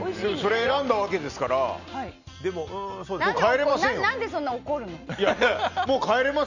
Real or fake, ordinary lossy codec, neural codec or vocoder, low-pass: real; none; none; 7.2 kHz